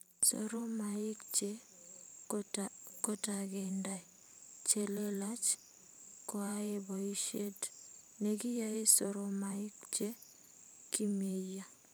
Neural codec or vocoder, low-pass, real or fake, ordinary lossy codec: vocoder, 44.1 kHz, 128 mel bands every 512 samples, BigVGAN v2; none; fake; none